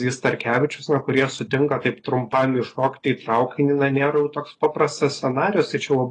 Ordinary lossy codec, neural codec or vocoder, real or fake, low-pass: AAC, 32 kbps; none; real; 10.8 kHz